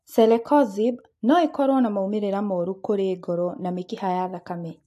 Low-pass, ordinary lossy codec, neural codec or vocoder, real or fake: 14.4 kHz; AAC, 64 kbps; none; real